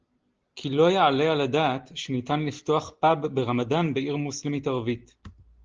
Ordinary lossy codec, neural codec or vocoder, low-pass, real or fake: Opus, 16 kbps; none; 7.2 kHz; real